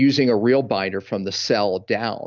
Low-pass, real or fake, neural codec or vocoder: 7.2 kHz; real; none